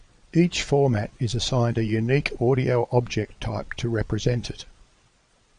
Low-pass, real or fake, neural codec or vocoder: 9.9 kHz; fake; vocoder, 22.05 kHz, 80 mel bands, Vocos